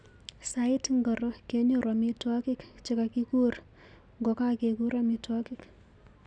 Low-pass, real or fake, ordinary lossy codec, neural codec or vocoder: 9.9 kHz; real; none; none